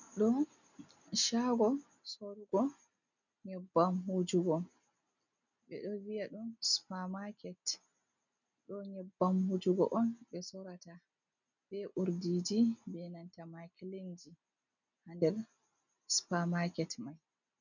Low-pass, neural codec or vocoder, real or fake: 7.2 kHz; none; real